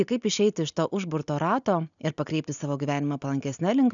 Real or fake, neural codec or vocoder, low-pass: real; none; 7.2 kHz